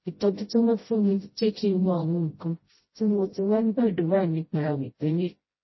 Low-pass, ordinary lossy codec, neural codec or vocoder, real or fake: 7.2 kHz; MP3, 24 kbps; codec, 16 kHz, 0.5 kbps, FreqCodec, smaller model; fake